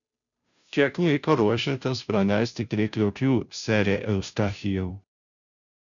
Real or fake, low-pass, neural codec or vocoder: fake; 7.2 kHz; codec, 16 kHz, 0.5 kbps, FunCodec, trained on Chinese and English, 25 frames a second